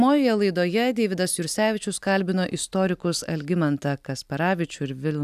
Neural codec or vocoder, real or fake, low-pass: none; real; 14.4 kHz